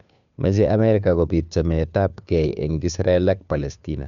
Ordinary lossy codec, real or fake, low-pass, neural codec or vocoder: none; fake; 7.2 kHz; codec, 16 kHz, 2 kbps, FunCodec, trained on Chinese and English, 25 frames a second